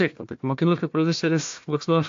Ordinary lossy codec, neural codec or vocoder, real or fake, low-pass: AAC, 48 kbps; codec, 16 kHz, 1 kbps, FunCodec, trained on Chinese and English, 50 frames a second; fake; 7.2 kHz